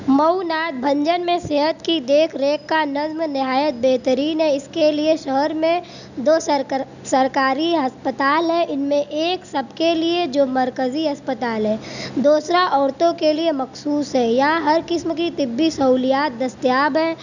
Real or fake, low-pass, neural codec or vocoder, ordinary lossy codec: real; 7.2 kHz; none; none